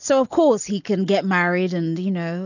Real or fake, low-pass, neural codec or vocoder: real; 7.2 kHz; none